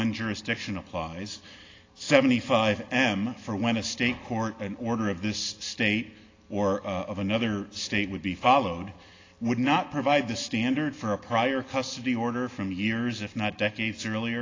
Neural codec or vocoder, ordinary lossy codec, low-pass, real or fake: none; AAC, 32 kbps; 7.2 kHz; real